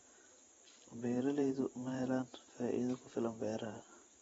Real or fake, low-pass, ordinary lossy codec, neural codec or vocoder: fake; 19.8 kHz; AAC, 24 kbps; vocoder, 44.1 kHz, 128 mel bands every 512 samples, BigVGAN v2